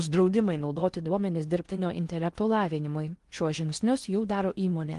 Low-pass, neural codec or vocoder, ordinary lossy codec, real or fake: 10.8 kHz; codec, 16 kHz in and 24 kHz out, 0.6 kbps, FocalCodec, streaming, 4096 codes; Opus, 24 kbps; fake